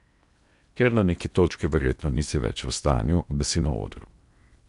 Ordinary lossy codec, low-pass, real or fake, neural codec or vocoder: none; 10.8 kHz; fake; codec, 16 kHz in and 24 kHz out, 0.8 kbps, FocalCodec, streaming, 65536 codes